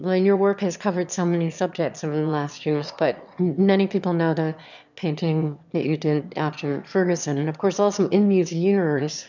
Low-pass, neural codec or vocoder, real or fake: 7.2 kHz; autoencoder, 22.05 kHz, a latent of 192 numbers a frame, VITS, trained on one speaker; fake